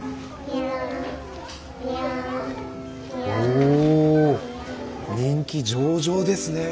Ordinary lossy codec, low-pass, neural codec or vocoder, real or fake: none; none; none; real